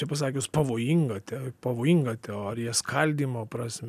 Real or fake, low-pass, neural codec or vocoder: real; 14.4 kHz; none